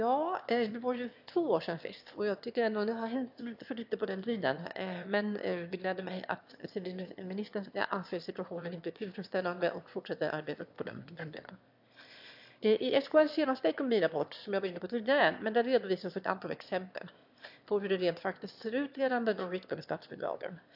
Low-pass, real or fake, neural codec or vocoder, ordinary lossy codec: 5.4 kHz; fake; autoencoder, 22.05 kHz, a latent of 192 numbers a frame, VITS, trained on one speaker; AAC, 48 kbps